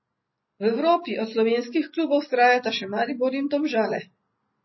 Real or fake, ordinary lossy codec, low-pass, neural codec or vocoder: real; MP3, 24 kbps; 7.2 kHz; none